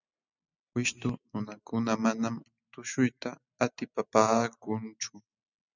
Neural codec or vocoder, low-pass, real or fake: none; 7.2 kHz; real